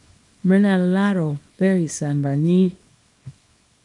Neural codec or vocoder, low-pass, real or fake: codec, 24 kHz, 0.9 kbps, WavTokenizer, small release; 10.8 kHz; fake